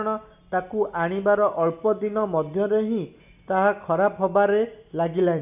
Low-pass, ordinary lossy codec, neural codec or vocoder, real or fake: 3.6 kHz; AAC, 32 kbps; none; real